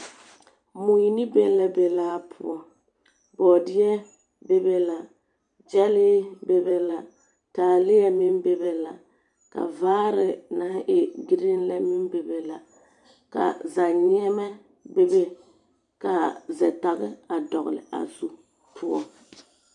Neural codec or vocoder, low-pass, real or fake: vocoder, 44.1 kHz, 128 mel bands every 512 samples, BigVGAN v2; 10.8 kHz; fake